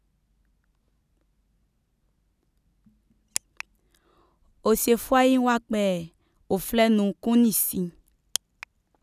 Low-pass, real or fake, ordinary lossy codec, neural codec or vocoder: 14.4 kHz; real; none; none